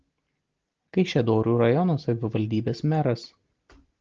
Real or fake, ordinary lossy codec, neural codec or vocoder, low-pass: real; Opus, 16 kbps; none; 7.2 kHz